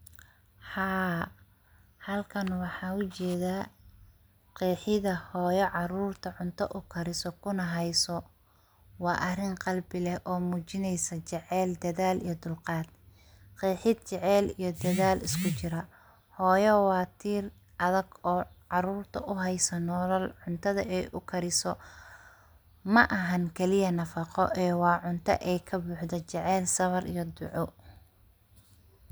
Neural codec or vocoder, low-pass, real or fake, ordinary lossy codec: none; none; real; none